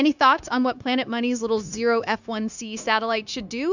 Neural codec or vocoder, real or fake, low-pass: codec, 16 kHz, 0.9 kbps, LongCat-Audio-Codec; fake; 7.2 kHz